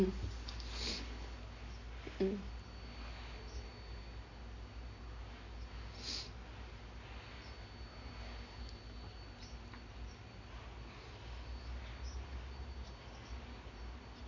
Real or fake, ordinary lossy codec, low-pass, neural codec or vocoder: real; none; 7.2 kHz; none